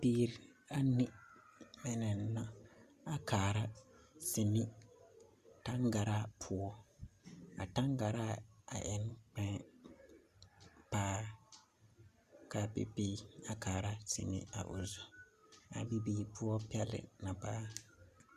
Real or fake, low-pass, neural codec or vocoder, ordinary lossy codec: real; 14.4 kHz; none; Opus, 64 kbps